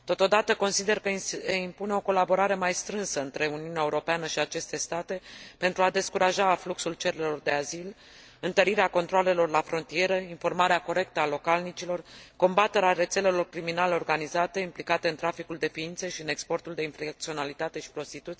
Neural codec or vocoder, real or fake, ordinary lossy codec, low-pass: none; real; none; none